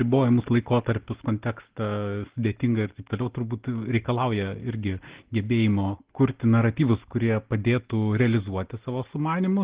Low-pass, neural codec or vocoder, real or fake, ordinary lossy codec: 3.6 kHz; none; real; Opus, 16 kbps